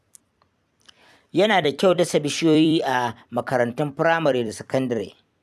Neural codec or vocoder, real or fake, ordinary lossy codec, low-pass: vocoder, 44.1 kHz, 128 mel bands every 256 samples, BigVGAN v2; fake; none; 14.4 kHz